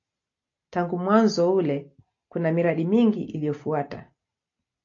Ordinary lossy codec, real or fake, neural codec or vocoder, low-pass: AAC, 48 kbps; real; none; 7.2 kHz